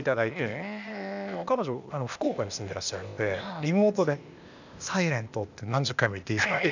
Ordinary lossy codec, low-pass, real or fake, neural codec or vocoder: none; 7.2 kHz; fake; codec, 16 kHz, 0.8 kbps, ZipCodec